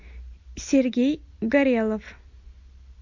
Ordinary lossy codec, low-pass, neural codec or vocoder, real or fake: MP3, 48 kbps; 7.2 kHz; none; real